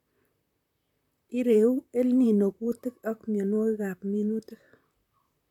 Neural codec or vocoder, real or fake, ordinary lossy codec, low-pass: vocoder, 44.1 kHz, 128 mel bands, Pupu-Vocoder; fake; none; 19.8 kHz